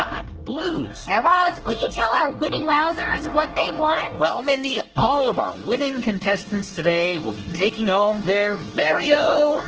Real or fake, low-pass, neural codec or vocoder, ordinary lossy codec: fake; 7.2 kHz; codec, 24 kHz, 1 kbps, SNAC; Opus, 16 kbps